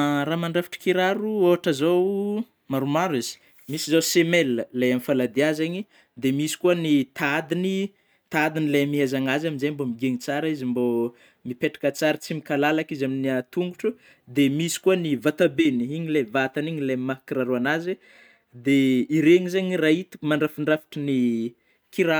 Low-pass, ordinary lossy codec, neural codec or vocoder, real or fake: none; none; none; real